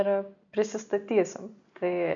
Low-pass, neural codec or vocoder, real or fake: 7.2 kHz; none; real